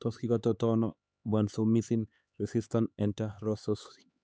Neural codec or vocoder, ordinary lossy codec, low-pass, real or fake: codec, 16 kHz, 4 kbps, X-Codec, HuBERT features, trained on LibriSpeech; none; none; fake